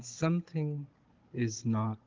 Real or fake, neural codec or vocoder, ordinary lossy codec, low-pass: fake; codec, 16 kHz, 16 kbps, FunCodec, trained on Chinese and English, 50 frames a second; Opus, 16 kbps; 7.2 kHz